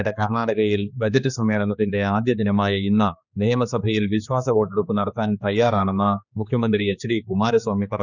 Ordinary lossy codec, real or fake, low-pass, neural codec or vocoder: none; fake; 7.2 kHz; codec, 16 kHz, 2 kbps, X-Codec, HuBERT features, trained on general audio